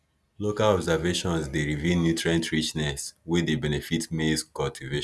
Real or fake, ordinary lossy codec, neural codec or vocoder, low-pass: real; none; none; none